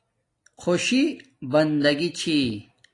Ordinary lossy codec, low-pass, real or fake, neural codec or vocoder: AAC, 48 kbps; 10.8 kHz; real; none